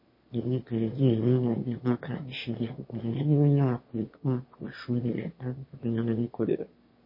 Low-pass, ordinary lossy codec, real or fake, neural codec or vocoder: 5.4 kHz; MP3, 24 kbps; fake; autoencoder, 22.05 kHz, a latent of 192 numbers a frame, VITS, trained on one speaker